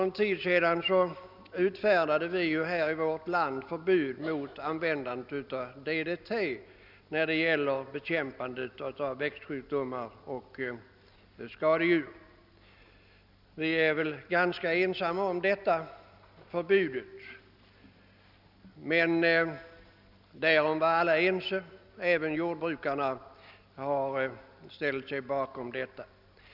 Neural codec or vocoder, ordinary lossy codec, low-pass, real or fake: none; none; 5.4 kHz; real